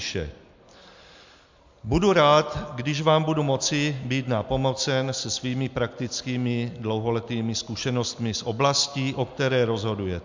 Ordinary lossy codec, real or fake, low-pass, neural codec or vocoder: MP3, 64 kbps; real; 7.2 kHz; none